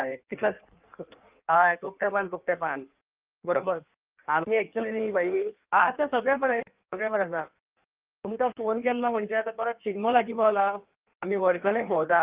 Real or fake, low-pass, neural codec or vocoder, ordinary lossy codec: fake; 3.6 kHz; codec, 16 kHz in and 24 kHz out, 1.1 kbps, FireRedTTS-2 codec; Opus, 24 kbps